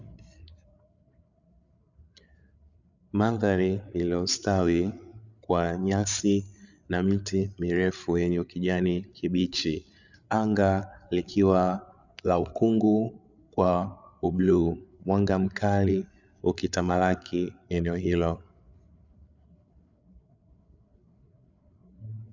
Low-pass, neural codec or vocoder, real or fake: 7.2 kHz; codec, 16 kHz, 8 kbps, FreqCodec, larger model; fake